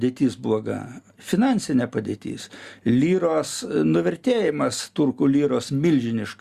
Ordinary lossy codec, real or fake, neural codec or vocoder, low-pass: Opus, 64 kbps; real; none; 14.4 kHz